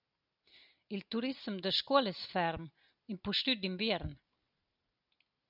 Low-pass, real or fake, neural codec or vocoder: 5.4 kHz; real; none